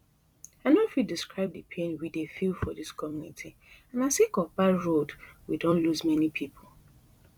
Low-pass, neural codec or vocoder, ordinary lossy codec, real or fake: 19.8 kHz; vocoder, 44.1 kHz, 128 mel bands every 256 samples, BigVGAN v2; none; fake